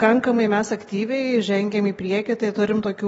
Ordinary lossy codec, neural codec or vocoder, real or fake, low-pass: AAC, 24 kbps; none; real; 19.8 kHz